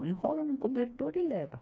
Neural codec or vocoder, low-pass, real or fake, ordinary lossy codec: codec, 16 kHz, 2 kbps, FreqCodec, smaller model; none; fake; none